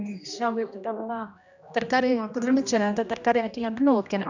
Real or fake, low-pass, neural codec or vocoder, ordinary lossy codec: fake; 7.2 kHz; codec, 16 kHz, 1 kbps, X-Codec, HuBERT features, trained on general audio; none